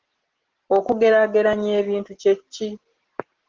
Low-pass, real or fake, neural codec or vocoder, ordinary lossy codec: 7.2 kHz; real; none; Opus, 16 kbps